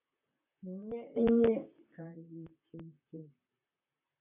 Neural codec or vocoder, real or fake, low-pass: vocoder, 44.1 kHz, 128 mel bands, Pupu-Vocoder; fake; 3.6 kHz